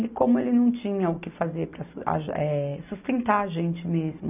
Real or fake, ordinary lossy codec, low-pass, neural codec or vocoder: real; none; 3.6 kHz; none